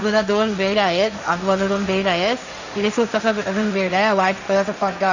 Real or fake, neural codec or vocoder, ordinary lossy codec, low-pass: fake; codec, 16 kHz, 1.1 kbps, Voila-Tokenizer; none; 7.2 kHz